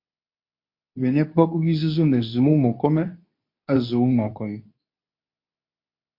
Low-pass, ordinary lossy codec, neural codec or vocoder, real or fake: 5.4 kHz; MP3, 32 kbps; codec, 24 kHz, 0.9 kbps, WavTokenizer, medium speech release version 2; fake